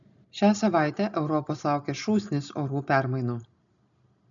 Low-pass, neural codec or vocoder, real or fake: 7.2 kHz; none; real